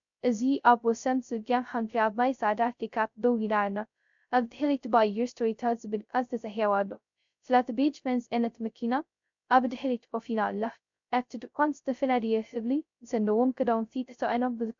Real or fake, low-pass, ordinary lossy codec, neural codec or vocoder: fake; 7.2 kHz; MP3, 64 kbps; codec, 16 kHz, 0.2 kbps, FocalCodec